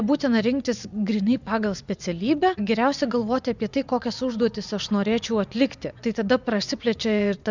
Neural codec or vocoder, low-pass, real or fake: vocoder, 24 kHz, 100 mel bands, Vocos; 7.2 kHz; fake